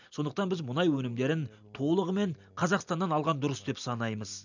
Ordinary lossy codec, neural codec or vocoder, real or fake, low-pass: none; none; real; 7.2 kHz